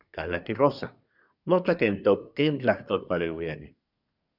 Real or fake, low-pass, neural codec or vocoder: fake; 5.4 kHz; codec, 24 kHz, 1 kbps, SNAC